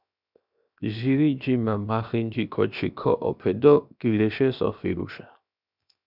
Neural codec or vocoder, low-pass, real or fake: codec, 16 kHz, 0.7 kbps, FocalCodec; 5.4 kHz; fake